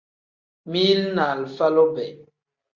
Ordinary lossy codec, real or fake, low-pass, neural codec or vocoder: Opus, 64 kbps; real; 7.2 kHz; none